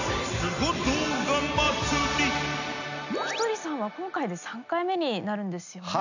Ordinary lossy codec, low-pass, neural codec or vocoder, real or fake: none; 7.2 kHz; none; real